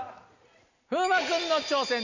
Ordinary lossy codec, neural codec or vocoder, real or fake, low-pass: none; none; real; 7.2 kHz